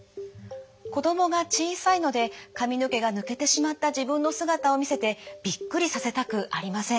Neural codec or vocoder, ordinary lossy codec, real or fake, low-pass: none; none; real; none